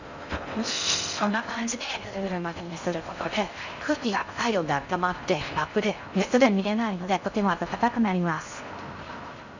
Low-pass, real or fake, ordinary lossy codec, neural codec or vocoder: 7.2 kHz; fake; none; codec, 16 kHz in and 24 kHz out, 0.6 kbps, FocalCodec, streaming, 4096 codes